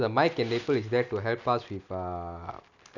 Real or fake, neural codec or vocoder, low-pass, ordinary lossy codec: real; none; 7.2 kHz; none